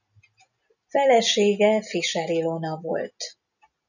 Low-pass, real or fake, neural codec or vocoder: 7.2 kHz; real; none